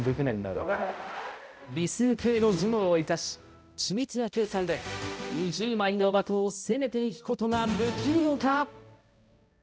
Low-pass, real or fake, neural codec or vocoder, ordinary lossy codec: none; fake; codec, 16 kHz, 0.5 kbps, X-Codec, HuBERT features, trained on balanced general audio; none